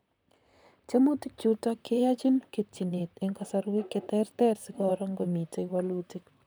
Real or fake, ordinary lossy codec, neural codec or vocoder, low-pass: fake; none; vocoder, 44.1 kHz, 128 mel bands, Pupu-Vocoder; none